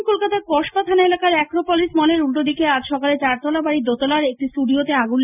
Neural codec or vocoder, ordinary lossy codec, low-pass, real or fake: none; none; 3.6 kHz; real